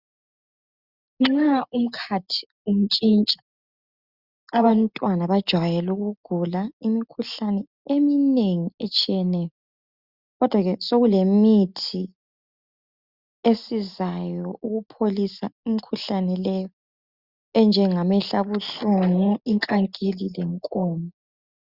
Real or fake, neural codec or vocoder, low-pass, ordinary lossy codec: real; none; 5.4 kHz; Opus, 64 kbps